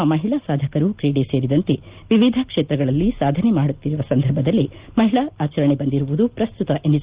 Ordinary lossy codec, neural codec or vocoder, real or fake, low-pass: Opus, 32 kbps; none; real; 3.6 kHz